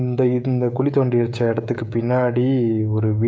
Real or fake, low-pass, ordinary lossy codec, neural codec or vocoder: fake; none; none; codec, 16 kHz, 16 kbps, FreqCodec, smaller model